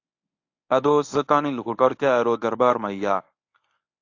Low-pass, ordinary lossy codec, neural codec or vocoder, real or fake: 7.2 kHz; AAC, 48 kbps; codec, 24 kHz, 0.9 kbps, WavTokenizer, medium speech release version 1; fake